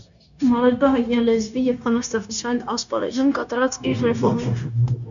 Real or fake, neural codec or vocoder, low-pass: fake; codec, 16 kHz, 0.9 kbps, LongCat-Audio-Codec; 7.2 kHz